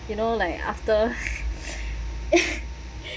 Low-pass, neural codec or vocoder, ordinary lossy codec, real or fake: none; none; none; real